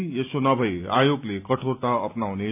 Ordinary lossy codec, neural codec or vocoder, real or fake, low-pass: none; none; real; 3.6 kHz